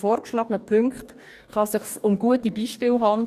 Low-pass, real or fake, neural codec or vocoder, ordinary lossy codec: 14.4 kHz; fake; codec, 44.1 kHz, 2.6 kbps, DAC; none